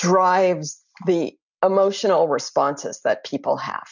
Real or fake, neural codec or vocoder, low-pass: real; none; 7.2 kHz